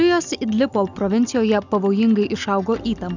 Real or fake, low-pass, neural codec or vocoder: real; 7.2 kHz; none